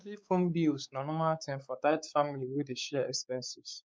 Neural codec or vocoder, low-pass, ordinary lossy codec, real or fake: codec, 16 kHz, 4 kbps, X-Codec, WavLM features, trained on Multilingual LibriSpeech; none; none; fake